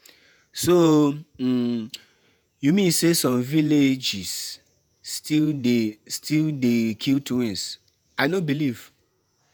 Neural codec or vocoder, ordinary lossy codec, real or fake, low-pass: vocoder, 48 kHz, 128 mel bands, Vocos; none; fake; none